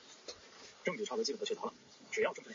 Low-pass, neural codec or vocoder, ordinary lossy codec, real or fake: 7.2 kHz; none; MP3, 32 kbps; real